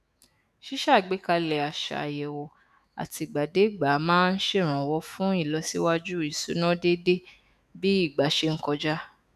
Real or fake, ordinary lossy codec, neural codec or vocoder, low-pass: fake; none; autoencoder, 48 kHz, 128 numbers a frame, DAC-VAE, trained on Japanese speech; 14.4 kHz